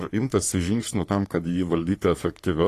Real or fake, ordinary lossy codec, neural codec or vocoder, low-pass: fake; AAC, 48 kbps; codec, 44.1 kHz, 3.4 kbps, Pupu-Codec; 14.4 kHz